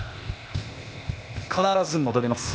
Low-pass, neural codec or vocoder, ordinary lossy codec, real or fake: none; codec, 16 kHz, 0.8 kbps, ZipCodec; none; fake